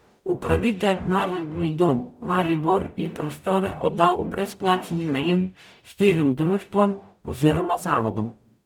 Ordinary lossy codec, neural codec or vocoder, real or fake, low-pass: none; codec, 44.1 kHz, 0.9 kbps, DAC; fake; 19.8 kHz